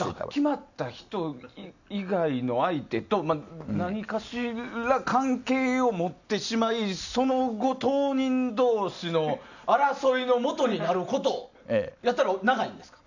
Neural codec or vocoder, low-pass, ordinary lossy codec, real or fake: none; 7.2 kHz; none; real